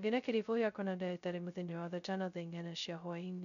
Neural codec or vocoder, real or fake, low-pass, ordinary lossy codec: codec, 16 kHz, 0.2 kbps, FocalCodec; fake; 7.2 kHz; none